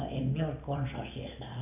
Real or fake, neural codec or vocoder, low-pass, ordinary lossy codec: real; none; 3.6 kHz; none